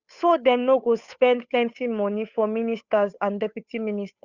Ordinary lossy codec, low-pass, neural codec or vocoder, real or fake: Opus, 64 kbps; 7.2 kHz; codec, 16 kHz, 8 kbps, FunCodec, trained on Chinese and English, 25 frames a second; fake